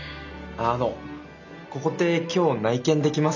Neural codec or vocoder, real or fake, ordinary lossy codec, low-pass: none; real; none; 7.2 kHz